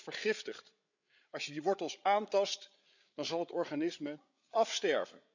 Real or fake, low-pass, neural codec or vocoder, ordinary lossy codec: fake; 7.2 kHz; codec, 16 kHz, 8 kbps, FreqCodec, larger model; none